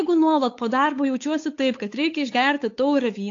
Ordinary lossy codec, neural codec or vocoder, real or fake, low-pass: AAC, 48 kbps; codec, 16 kHz, 8 kbps, FunCodec, trained on Chinese and English, 25 frames a second; fake; 7.2 kHz